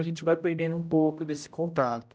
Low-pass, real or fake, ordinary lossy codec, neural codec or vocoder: none; fake; none; codec, 16 kHz, 0.5 kbps, X-Codec, HuBERT features, trained on general audio